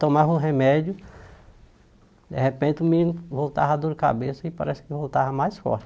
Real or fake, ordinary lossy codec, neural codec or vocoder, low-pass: real; none; none; none